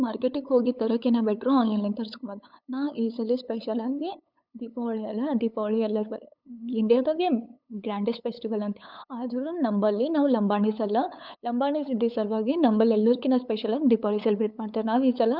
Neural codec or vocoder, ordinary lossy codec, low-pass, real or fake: codec, 16 kHz, 8 kbps, FunCodec, trained on LibriTTS, 25 frames a second; none; 5.4 kHz; fake